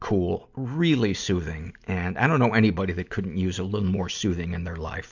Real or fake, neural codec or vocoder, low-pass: real; none; 7.2 kHz